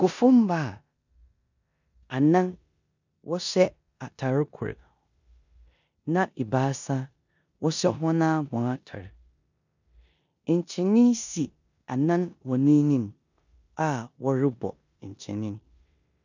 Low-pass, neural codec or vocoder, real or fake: 7.2 kHz; codec, 16 kHz in and 24 kHz out, 0.9 kbps, LongCat-Audio-Codec, four codebook decoder; fake